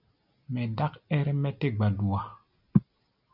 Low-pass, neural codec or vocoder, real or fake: 5.4 kHz; none; real